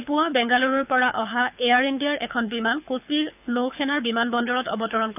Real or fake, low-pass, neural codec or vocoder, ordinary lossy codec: fake; 3.6 kHz; codec, 24 kHz, 6 kbps, HILCodec; none